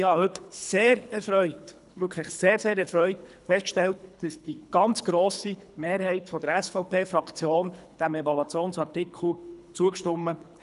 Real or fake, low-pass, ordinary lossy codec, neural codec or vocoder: fake; 10.8 kHz; none; codec, 24 kHz, 3 kbps, HILCodec